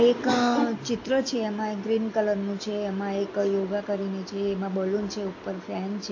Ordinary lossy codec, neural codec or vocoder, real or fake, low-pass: AAC, 48 kbps; none; real; 7.2 kHz